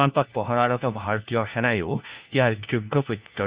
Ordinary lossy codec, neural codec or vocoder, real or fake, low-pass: Opus, 64 kbps; codec, 16 kHz, 1 kbps, FunCodec, trained on LibriTTS, 50 frames a second; fake; 3.6 kHz